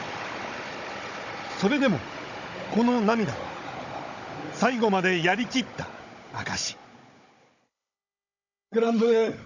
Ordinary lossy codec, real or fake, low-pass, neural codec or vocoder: none; fake; 7.2 kHz; codec, 16 kHz, 16 kbps, FunCodec, trained on Chinese and English, 50 frames a second